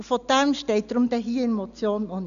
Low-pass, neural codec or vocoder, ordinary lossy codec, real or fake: 7.2 kHz; none; none; real